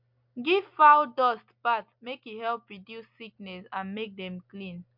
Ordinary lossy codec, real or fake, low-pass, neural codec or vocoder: MP3, 48 kbps; real; 5.4 kHz; none